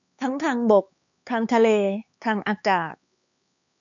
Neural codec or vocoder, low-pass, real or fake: codec, 16 kHz, 4 kbps, X-Codec, HuBERT features, trained on LibriSpeech; 7.2 kHz; fake